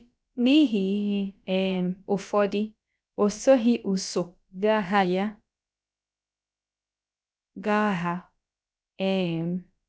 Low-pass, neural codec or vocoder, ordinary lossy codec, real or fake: none; codec, 16 kHz, about 1 kbps, DyCAST, with the encoder's durations; none; fake